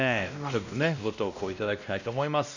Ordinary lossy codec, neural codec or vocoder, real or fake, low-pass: none; codec, 16 kHz, 1 kbps, X-Codec, WavLM features, trained on Multilingual LibriSpeech; fake; 7.2 kHz